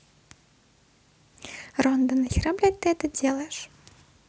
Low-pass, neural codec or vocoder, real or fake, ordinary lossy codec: none; none; real; none